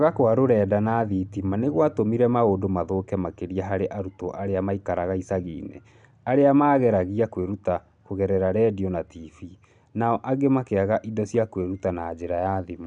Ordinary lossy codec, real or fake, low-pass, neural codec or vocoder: none; real; 9.9 kHz; none